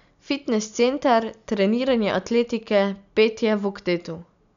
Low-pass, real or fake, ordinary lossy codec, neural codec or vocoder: 7.2 kHz; real; none; none